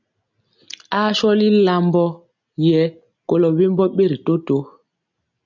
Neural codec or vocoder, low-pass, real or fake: none; 7.2 kHz; real